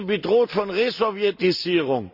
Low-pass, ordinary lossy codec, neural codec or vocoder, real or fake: 5.4 kHz; none; none; real